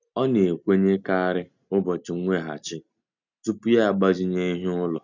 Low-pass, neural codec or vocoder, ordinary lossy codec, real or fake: 7.2 kHz; none; none; real